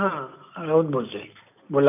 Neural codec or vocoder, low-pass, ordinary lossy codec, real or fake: none; 3.6 kHz; none; real